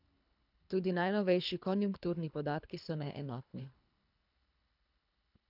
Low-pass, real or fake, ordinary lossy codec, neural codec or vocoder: 5.4 kHz; fake; none; codec, 24 kHz, 3 kbps, HILCodec